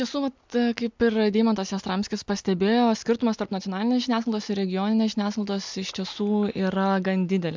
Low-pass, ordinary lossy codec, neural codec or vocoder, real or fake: 7.2 kHz; MP3, 64 kbps; none; real